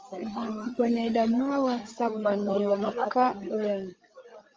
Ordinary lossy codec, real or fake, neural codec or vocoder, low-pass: Opus, 24 kbps; fake; codec, 16 kHz, 8 kbps, FreqCodec, larger model; 7.2 kHz